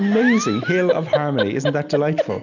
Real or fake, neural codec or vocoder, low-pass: real; none; 7.2 kHz